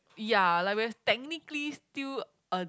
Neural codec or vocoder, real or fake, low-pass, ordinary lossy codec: none; real; none; none